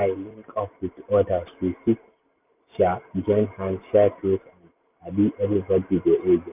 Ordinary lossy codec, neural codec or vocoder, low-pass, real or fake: none; none; 3.6 kHz; real